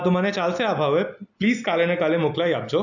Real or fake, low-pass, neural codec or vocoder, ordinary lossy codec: real; 7.2 kHz; none; none